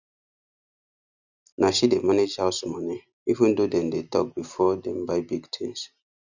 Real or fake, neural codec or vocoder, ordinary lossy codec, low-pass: real; none; none; 7.2 kHz